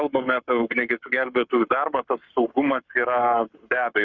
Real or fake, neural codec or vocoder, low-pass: fake; codec, 44.1 kHz, 7.8 kbps, Pupu-Codec; 7.2 kHz